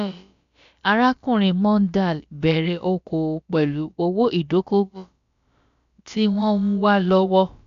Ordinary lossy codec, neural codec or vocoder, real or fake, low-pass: none; codec, 16 kHz, about 1 kbps, DyCAST, with the encoder's durations; fake; 7.2 kHz